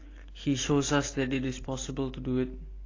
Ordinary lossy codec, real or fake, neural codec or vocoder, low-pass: AAC, 32 kbps; real; none; 7.2 kHz